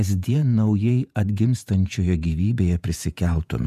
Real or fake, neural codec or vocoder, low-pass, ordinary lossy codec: fake; vocoder, 48 kHz, 128 mel bands, Vocos; 14.4 kHz; MP3, 96 kbps